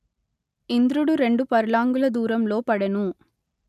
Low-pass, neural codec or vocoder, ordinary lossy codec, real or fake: 14.4 kHz; none; none; real